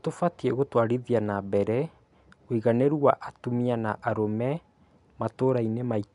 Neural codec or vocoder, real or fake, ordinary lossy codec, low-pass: none; real; none; 10.8 kHz